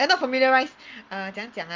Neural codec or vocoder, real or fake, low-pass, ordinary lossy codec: none; real; 7.2 kHz; Opus, 32 kbps